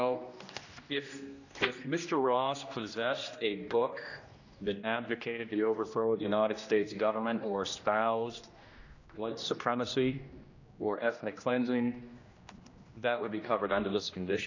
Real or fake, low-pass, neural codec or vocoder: fake; 7.2 kHz; codec, 16 kHz, 1 kbps, X-Codec, HuBERT features, trained on general audio